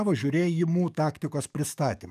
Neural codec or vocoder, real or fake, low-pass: codec, 44.1 kHz, 7.8 kbps, DAC; fake; 14.4 kHz